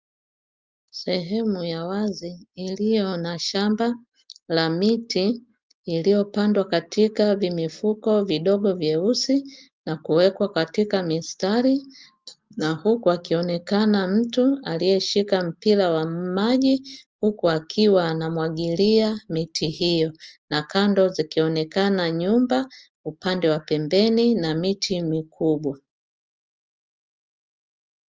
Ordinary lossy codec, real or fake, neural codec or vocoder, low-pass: Opus, 24 kbps; real; none; 7.2 kHz